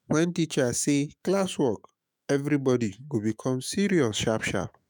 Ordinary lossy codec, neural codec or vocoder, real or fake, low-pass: none; autoencoder, 48 kHz, 128 numbers a frame, DAC-VAE, trained on Japanese speech; fake; none